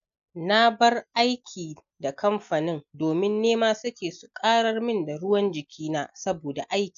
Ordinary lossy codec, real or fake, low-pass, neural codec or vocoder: none; real; 7.2 kHz; none